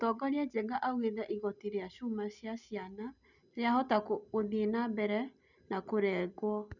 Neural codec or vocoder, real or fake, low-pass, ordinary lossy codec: none; real; 7.2 kHz; none